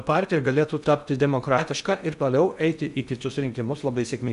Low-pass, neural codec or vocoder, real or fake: 10.8 kHz; codec, 16 kHz in and 24 kHz out, 0.6 kbps, FocalCodec, streaming, 2048 codes; fake